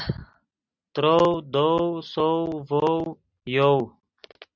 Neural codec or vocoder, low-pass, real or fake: none; 7.2 kHz; real